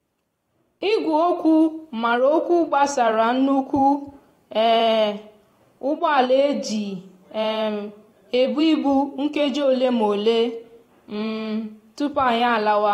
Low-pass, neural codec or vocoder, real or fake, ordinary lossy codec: 19.8 kHz; vocoder, 44.1 kHz, 128 mel bands every 512 samples, BigVGAN v2; fake; AAC, 48 kbps